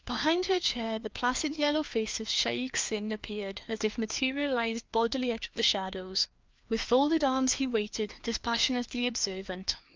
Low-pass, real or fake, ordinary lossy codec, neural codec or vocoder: 7.2 kHz; fake; Opus, 32 kbps; codec, 16 kHz, 2 kbps, FreqCodec, larger model